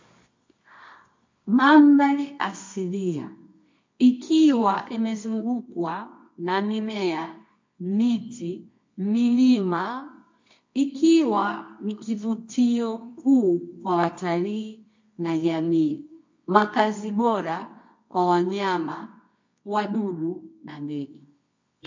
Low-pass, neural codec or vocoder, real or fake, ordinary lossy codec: 7.2 kHz; codec, 24 kHz, 0.9 kbps, WavTokenizer, medium music audio release; fake; MP3, 48 kbps